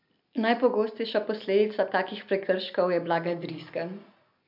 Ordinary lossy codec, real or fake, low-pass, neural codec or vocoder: MP3, 48 kbps; real; 5.4 kHz; none